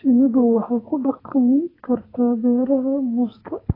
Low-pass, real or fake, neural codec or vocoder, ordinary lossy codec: 5.4 kHz; fake; codec, 44.1 kHz, 2.6 kbps, SNAC; AAC, 24 kbps